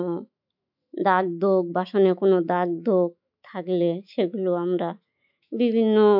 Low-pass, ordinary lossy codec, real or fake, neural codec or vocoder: 5.4 kHz; none; fake; autoencoder, 48 kHz, 128 numbers a frame, DAC-VAE, trained on Japanese speech